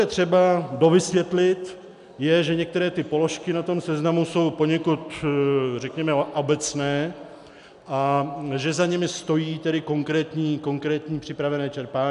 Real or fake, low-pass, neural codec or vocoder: real; 10.8 kHz; none